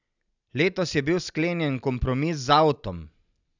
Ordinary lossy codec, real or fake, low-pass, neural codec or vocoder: none; real; 7.2 kHz; none